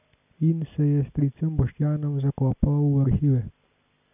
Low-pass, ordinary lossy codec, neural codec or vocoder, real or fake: 3.6 kHz; none; none; real